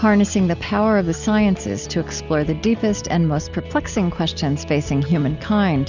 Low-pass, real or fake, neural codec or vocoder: 7.2 kHz; real; none